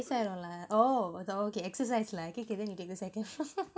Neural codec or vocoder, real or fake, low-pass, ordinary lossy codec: none; real; none; none